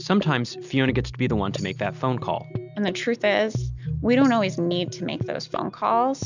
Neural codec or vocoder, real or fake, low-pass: none; real; 7.2 kHz